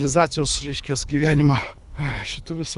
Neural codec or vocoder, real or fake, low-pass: codec, 24 kHz, 3 kbps, HILCodec; fake; 10.8 kHz